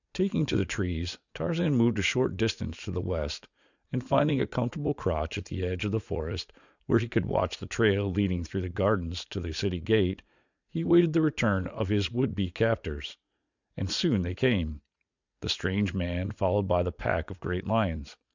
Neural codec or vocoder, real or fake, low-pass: vocoder, 44.1 kHz, 80 mel bands, Vocos; fake; 7.2 kHz